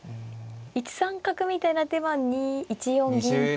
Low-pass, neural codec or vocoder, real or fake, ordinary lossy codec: none; none; real; none